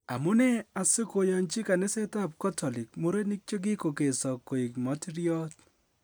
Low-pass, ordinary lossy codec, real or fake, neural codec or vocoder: none; none; real; none